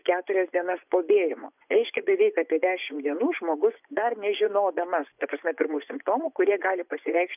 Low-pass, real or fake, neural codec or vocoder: 3.6 kHz; fake; vocoder, 24 kHz, 100 mel bands, Vocos